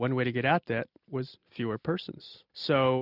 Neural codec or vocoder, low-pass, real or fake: none; 5.4 kHz; real